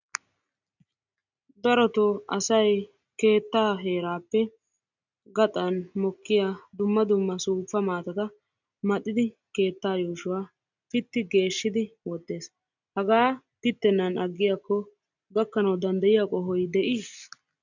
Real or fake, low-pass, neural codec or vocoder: real; 7.2 kHz; none